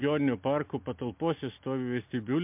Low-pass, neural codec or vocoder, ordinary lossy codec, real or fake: 3.6 kHz; none; MP3, 32 kbps; real